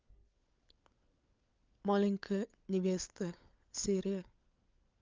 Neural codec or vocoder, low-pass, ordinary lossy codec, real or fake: codec, 16 kHz, 16 kbps, FunCodec, trained on LibriTTS, 50 frames a second; 7.2 kHz; Opus, 24 kbps; fake